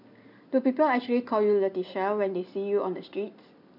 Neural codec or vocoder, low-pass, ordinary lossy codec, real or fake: none; 5.4 kHz; none; real